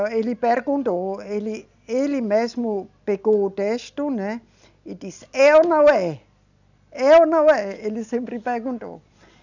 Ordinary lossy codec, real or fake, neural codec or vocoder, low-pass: none; real; none; 7.2 kHz